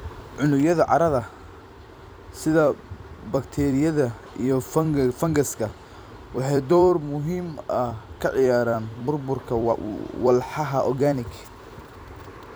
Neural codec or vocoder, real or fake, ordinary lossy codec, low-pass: vocoder, 44.1 kHz, 128 mel bands every 256 samples, BigVGAN v2; fake; none; none